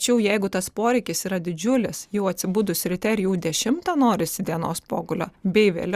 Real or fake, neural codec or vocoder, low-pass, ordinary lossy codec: real; none; 14.4 kHz; Opus, 64 kbps